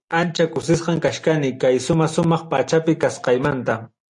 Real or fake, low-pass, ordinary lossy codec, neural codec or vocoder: real; 10.8 kHz; AAC, 64 kbps; none